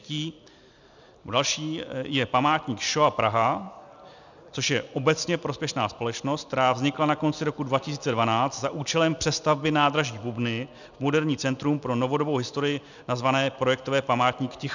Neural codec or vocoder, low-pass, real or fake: none; 7.2 kHz; real